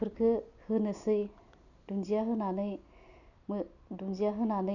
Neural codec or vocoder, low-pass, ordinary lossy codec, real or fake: none; 7.2 kHz; none; real